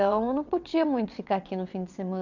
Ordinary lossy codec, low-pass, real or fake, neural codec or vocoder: none; 7.2 kHz; fake; vocoder, 22.05 kHz, 80 mel bands, WaveNeXt